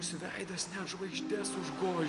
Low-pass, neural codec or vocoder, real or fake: 10.8 kHz; none; real